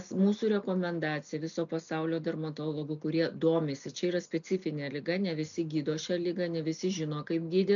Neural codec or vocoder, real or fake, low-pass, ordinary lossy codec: none; real; 7.2 kHz; MP3, 96 kbps